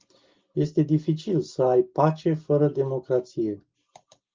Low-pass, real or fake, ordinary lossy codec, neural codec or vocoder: 7.2 kHz; real; Opus, 32 kbps; none